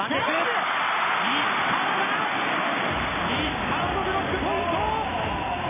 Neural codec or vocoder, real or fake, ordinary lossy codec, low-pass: none; real; MP3, 16 kbps; 3.6 kHz